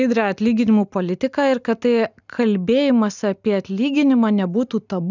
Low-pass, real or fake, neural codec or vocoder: 7.2 kHz; real; none